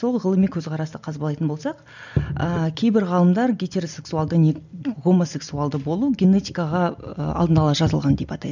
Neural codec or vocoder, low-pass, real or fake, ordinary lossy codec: none; 7.2 kHz; real; none